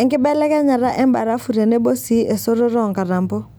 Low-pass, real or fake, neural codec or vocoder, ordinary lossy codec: none; real; none; none